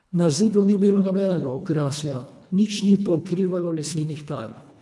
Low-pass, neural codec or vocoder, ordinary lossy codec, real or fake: none; codec, 24 kHz, 1.5 kbps, HILCodec; none; fake